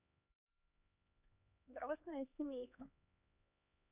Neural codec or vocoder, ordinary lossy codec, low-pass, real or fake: codec, 16 kHz, 2 kbps, X-Codec, HuBERT features, trained on LibriSpeech; none; 3.6 kHz; fake